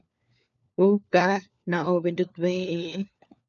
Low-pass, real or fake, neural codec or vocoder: 7.2 kHz; fake; codec, 16 kHz, 4 kbps, FunCodec, trained on LibriTTS, 50 frames a second